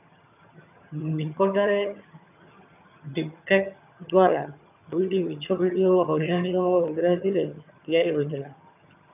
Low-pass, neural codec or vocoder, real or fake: 3.6 kHz; vocoder, 22.05 kHz, 80 mel bands, HiFi-GAN; fake